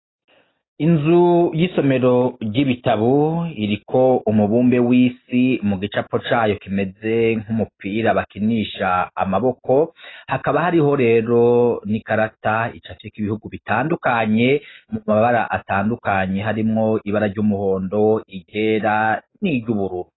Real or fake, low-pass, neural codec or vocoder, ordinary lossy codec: real; 7.2 kHz; none; AAC, 16 kbps